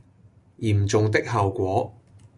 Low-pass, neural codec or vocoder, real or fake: 10.8 kHz; none; real